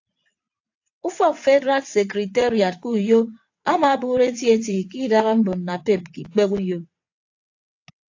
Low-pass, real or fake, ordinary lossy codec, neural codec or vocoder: 7.2 kHz; fake; AAC, 48 kbps; vocoder, 22.05 kHz, 80 mel bands, WaveNeXt